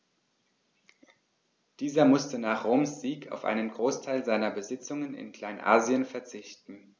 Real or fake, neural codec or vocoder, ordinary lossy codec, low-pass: real; none; none; none